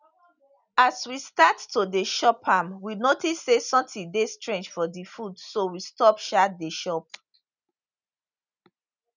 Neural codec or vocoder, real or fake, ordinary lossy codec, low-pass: none; real; none; 7.2 kHz